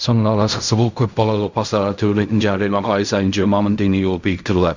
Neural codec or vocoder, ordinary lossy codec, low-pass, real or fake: codec, 16 kHz in and 24 kHz out, 0.4 kbps, LongCat-Audio-Codec, fine tuned four codebook decoder; Opus, 64 kbps; 7.2 kHz; fake